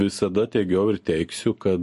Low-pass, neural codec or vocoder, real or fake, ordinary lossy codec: 14.4 kHz; none; real; MP3, 48 kbps